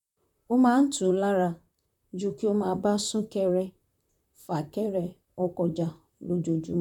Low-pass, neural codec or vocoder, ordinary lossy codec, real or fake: 19.8 kHz; vocoder, 44.1 kHz, 128 mel bands, Pupu-Vocoder; none; fake